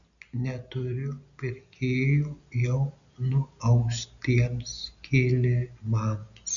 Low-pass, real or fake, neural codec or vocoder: 7.2 kHz; real; none